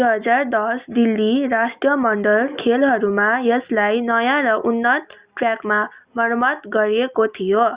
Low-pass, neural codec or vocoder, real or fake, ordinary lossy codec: 3.6 kHz; none; real; Opus, 64 kbps